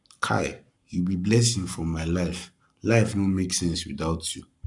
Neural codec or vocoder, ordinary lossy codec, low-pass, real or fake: codec, 44.1 kHz, 7.8 kbps, Pupu-Codec; none; 10.8 kHz; fake